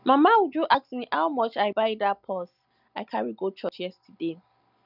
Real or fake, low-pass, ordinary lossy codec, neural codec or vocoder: real; 5.4 kHz; none; none